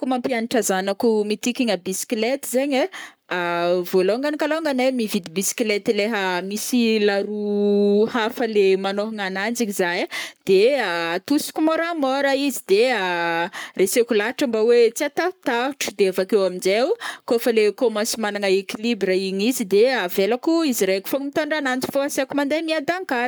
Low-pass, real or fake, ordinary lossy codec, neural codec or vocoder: none; fake; none; codec, 44.1 kHz, 7.8 kbps, Pupu-Codec